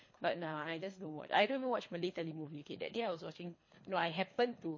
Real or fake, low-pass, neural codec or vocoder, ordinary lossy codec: fake; 7.2 kHz; codec, 24 kHz, 3 kbps, HILCodec; MP3, 32 kbps